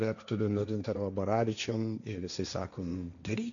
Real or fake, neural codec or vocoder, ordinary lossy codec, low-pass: fake; codec, 16 kHz, 1.1 kbps, Voila-Tokenizer; MP3, 96 kbps; 7.2 kHz